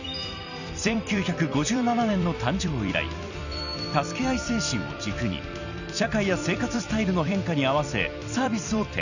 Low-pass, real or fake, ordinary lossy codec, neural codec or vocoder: 7.2 kHz; fake; none; vocoder, 44.1 kHz, 128 mel bands every 256 samples, BigVGAN v2